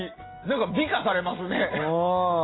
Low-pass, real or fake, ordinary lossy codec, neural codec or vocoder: 7.2 kHz; real; AAC, 16 kbps; none